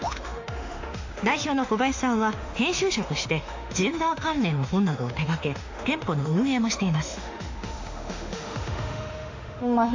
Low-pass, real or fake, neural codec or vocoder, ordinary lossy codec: 7.2 kHz; fake; autoencoder, 48 kHz, 32 numbers a frame, DAC-VAE, trained on Japanese speech; AAC, 48 kbps